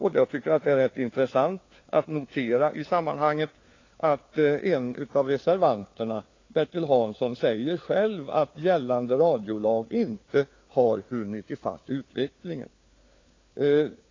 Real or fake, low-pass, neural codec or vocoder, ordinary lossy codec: fake; 7.2 kHz; codec, 16 kHz, 4 kbps, FunCodec, trained on LibriTTS, 50 frames a second; AAC, 32 kbps